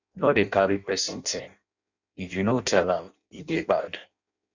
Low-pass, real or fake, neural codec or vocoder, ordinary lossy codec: 7.2 kHz; fake; codec, 16 kHz in and 24 kHz out, 0.6 kbps, FireRedTTS-2 codec; none